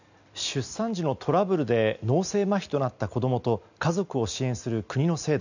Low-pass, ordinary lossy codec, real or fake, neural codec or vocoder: 7.2 kHz; none; real; none